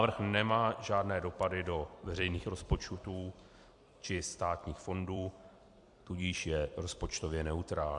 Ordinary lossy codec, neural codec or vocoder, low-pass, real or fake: MP3, 64 kbps; vocoder, 44.1 kHz, 128 mel bands every 256 samples, BigVGAN v2; 10.8 kHz; fake